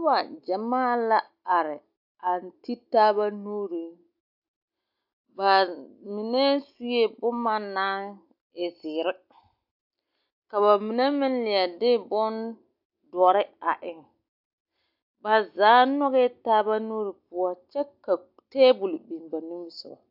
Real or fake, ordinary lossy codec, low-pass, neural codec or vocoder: real; AAC, 48 kbps; 5.4 kHz; none